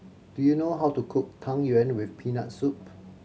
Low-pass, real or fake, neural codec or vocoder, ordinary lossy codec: none; real; none; none